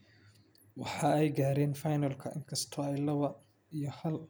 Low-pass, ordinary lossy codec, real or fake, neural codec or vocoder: none; none; real; none